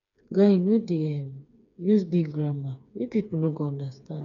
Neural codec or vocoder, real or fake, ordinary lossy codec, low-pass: codec, 16 kHz, 4 kbps, FreqCodec, smaller model; fake; none; 7.2 kHz